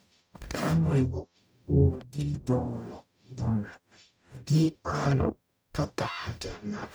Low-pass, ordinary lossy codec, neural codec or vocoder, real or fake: none; none; codec, 44.1 kHz, 0.9 kbps, DAC; fake